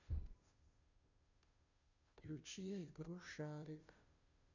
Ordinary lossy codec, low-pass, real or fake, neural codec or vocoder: none; 7.2 kHz; fake; codec, 16 kHz, 0.5 kbps, FunCodec, trained on Chinese and English, 25 frames a second